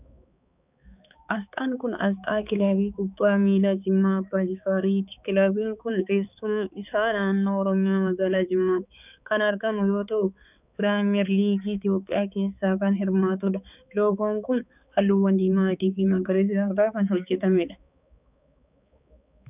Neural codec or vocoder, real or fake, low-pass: codec, 16 kHz, 4 kbps, X-Codec, HuBERT features, trained on balanced general audio; fake; 3.6 kHz